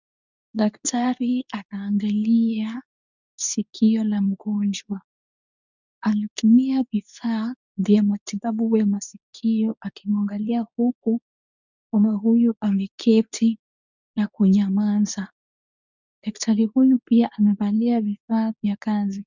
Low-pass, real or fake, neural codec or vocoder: 7.2 kHz; fake; codec, 24 kHz, 0.9 kbps, WavTokenizer, medium speech release version 2